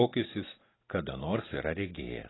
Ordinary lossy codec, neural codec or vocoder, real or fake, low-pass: AAC, 16 kbps; none; real; 7.2 kHz